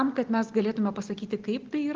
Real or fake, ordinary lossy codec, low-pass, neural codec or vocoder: real; Opus, 16 kbps; 7.2 kHz; none